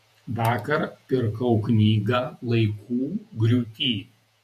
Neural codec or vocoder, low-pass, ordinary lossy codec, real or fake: vocoder, 48 kHz, 128 mel bands, Vocos; 14.4 kHz; MP3, 64 kbps; fake